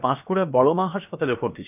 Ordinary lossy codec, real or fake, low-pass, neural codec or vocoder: none; fake; 3.6 kHz; codec, 16 kHz, about 1 kbps, DyCAST, with the encoder's durations